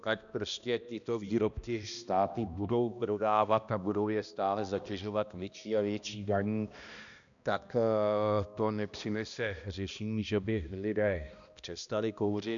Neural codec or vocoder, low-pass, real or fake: codec, 16 kHz, 1 kbps, X-Codec, HuBERT features, trained on balanced general audio; 7.2 kHz; fake